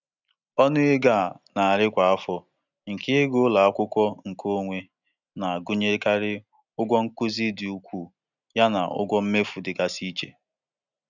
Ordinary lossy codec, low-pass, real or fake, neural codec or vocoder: none; 7.2 kHz; real; none